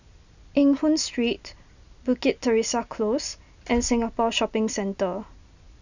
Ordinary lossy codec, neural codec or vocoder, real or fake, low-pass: none; none; real; 7.2 kHz